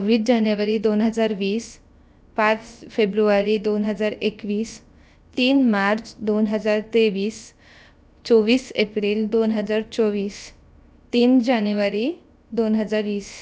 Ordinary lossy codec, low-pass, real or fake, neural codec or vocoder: none; none; fake; codec, 16 kHz, about 1 kbps, DyCAST, with the encoder's durations